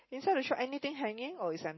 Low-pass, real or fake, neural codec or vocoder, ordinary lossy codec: 7.2 kHz; real; none; MP3, 24 kbps